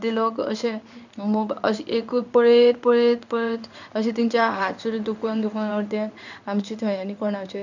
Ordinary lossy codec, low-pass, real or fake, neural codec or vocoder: none; 7.2 kHz; fake; codec, 16 kHz in and 24 kHz out, 1 kbps, XY-Tokenizer